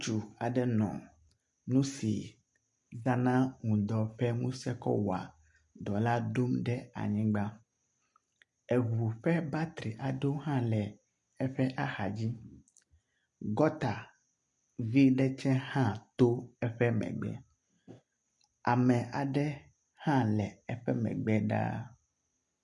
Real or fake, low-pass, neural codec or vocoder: real; 10.8 kHz; none